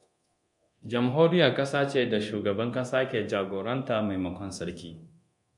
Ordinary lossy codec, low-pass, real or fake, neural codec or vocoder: MP3, 64 kbps; 10.8 kHz; fake; codec, 24 kHz, 0.9 kbps, DualCodec